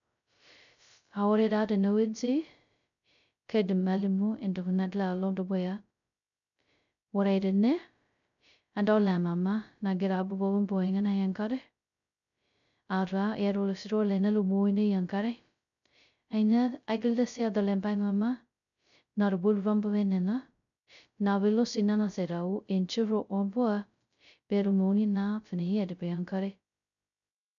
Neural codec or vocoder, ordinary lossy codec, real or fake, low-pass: codec, 16 kHz, 0.2 kbps, FocalCodec; none; fake; 7.2 kHz